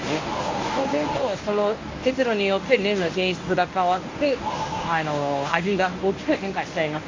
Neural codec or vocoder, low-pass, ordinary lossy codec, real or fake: codec, 24 kHz, 0.9 kbps, WavTokenizer, medium speech release version 1; 7.2 kHz; MP3, 48 kbps; fake